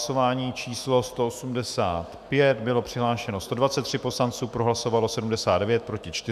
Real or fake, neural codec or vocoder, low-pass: fake; autoencoder, 48 kHz, 128 numbers a frame, DAC-VAE, trained on Japanese speech; 14.4 kHz